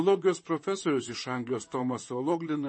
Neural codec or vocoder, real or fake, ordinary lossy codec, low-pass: vocoder, 44.1 kHz, 128 mel bands, Pupu-Vocoder; fake; MP3, 32 kbps; 9.9 kHz